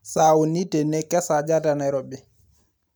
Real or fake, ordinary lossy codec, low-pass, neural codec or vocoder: real; none; none; none